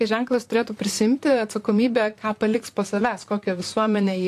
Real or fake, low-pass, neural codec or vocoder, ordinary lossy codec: fake; 14.4 kHz; vocoder, 44.1 kHz, 128 mel bands, Pupu-Vocoder; AAC, 64 kbps